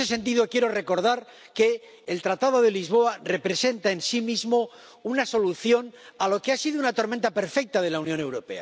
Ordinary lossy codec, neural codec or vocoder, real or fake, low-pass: none; none; real; none